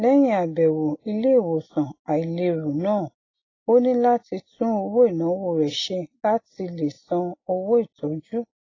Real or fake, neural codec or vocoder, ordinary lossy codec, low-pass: real; none; AAC, 32 kbps; 7.2 kHz